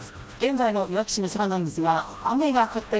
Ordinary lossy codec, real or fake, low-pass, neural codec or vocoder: none; fake; none; codec, 16 kHz, 1 kbps, FreqCodec, smaller model